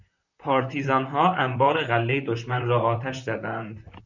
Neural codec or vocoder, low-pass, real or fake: vocoder, 44.1 kHz, 128 mel bands, Pupu-Vocoder; 7.2 kHz; fake